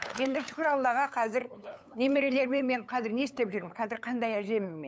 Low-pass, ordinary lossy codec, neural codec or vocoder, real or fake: none; none; codec, 16 kHz, 8 kbps, FunCodec, trained on LibriTTS, 25 frames a second; fake